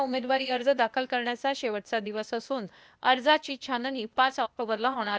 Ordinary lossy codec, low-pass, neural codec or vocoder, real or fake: none; none; codec, 16 kHz, 0.8 kbps, ZipCodec; fake